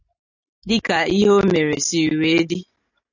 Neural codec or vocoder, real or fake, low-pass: none; real; 7.2 kHz